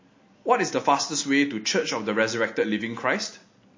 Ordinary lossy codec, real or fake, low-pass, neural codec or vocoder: MP3, 32 kbps; real; 7.2 kHz; none